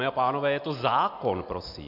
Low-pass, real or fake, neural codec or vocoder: 5.4 kHz; real; none